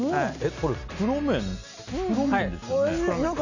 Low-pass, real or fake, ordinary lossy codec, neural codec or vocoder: 7.2 kHz; real; none; none